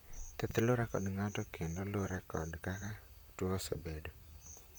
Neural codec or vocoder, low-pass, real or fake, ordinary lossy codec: vocoder, 44.1 kHz, 128 mel bands, Pupu-Vocoder; none; fake; none